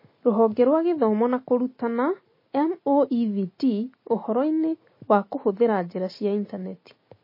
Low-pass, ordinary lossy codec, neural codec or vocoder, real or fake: 5.4 kHz; MP3, 24 kbps; none; real